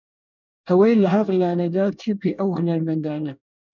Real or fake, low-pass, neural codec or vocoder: fake; 7.2 kHz; codec, 24 kHz, 1 kbps, SNAC